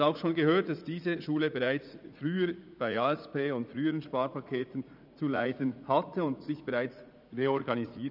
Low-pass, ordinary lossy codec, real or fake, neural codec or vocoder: 5.4 kHz; none; fake; vocoder, 44.1 kHz, 80 mel bands, Vocos